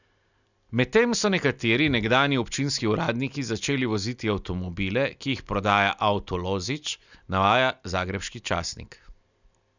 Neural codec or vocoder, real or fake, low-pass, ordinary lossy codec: none; real; 7.2 kHz; none